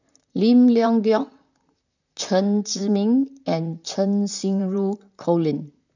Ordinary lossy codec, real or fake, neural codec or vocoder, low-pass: none; fake; vocoder, 44.1 kHz, 128 mel bands, Pupu-Vocoder; 7.2 kHz